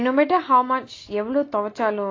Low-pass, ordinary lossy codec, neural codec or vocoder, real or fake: 7.2 kHz; AAC, 32 kbps; none; real